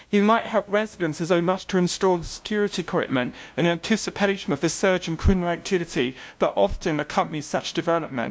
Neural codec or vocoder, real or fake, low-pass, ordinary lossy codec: codec, 16 kHz, 0.5 kbps, FunCodec, trained on LibriTTS, 25 frames a second; fake; none; none